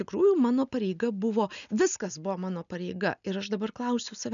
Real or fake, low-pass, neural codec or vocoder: real; 7.2 kHz; none